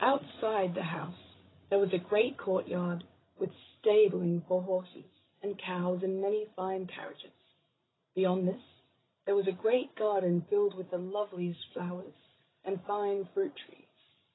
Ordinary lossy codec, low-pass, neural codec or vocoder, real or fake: AAC, 16 kbps; 7.2 kHz; codec, 16 kHz, 16 kbps, FreqCodec, larger model; fake